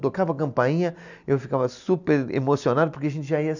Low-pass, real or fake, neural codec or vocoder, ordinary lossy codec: 7.2 kHz; real; none; none